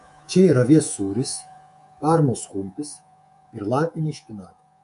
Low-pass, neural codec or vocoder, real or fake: 10.8 kHz; codec, 24 kHz, 3.1 kbps, DualCodec; fake